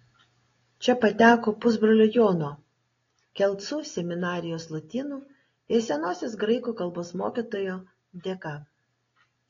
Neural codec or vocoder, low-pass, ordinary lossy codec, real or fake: none; 7.2 kHz; AAC, 32 kbps; real